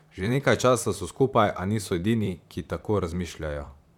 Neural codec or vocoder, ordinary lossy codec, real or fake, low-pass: vocoder, 44.1 kHz, 128 mel bands, Pupu-Vocoder; none; fake; 19.8 kHz